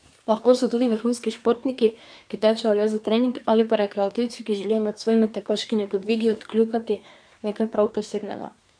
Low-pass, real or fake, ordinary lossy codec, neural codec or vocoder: 9.9 kHz; fake; none; codec, 24 kHz, 1 kbps, SNAC